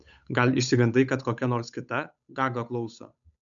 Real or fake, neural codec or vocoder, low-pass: fake; codec, 16 kHz, 8 kbps, FunCodec, trained on Chinese and English, 25 frames a second; 7.2 kHz